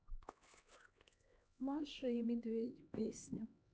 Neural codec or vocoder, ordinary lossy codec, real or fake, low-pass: codec, 16 kHz, 2 kbps, X-Codec, HuBERT features, trained on LibriSpeech; none; fake; none